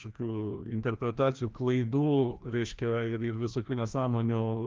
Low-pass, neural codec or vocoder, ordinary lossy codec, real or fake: 7.2 kHz; codec, 16 kHz, 1 kbps, FreqCodec, larger model; Opus, 32 kbps; fake